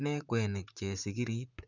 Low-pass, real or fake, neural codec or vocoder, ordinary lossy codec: 7.2 kHz; fake; vocoder, 44.1 kHz, 128 mel bands, Pupu-Vocoder; none